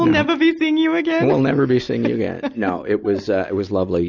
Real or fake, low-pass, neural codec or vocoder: real; 7.2 kHz; none